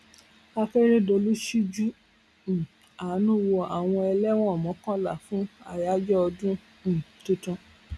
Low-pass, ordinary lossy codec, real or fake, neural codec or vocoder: none; none; real; none